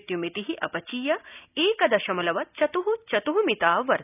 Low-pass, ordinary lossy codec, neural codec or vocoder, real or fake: 3.6 kHz; none; none; real